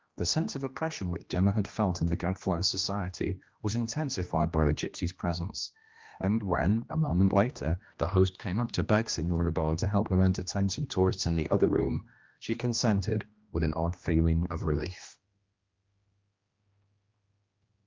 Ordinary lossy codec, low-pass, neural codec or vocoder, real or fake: Opus, 24 kbps; 7.2 kHz; codec, 16 kHz, 1 kbps, X-Codec, HuBERT features, trained on general audio; fake